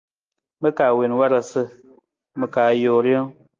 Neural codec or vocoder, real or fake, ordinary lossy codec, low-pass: none; real; Opus, 32 kbps; 7.2 kHz